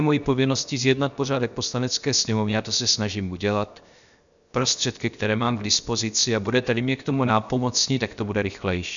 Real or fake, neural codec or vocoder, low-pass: fake; codec, 16 kHz, 0.7 kbps, FocalCodec; 7.2 kHz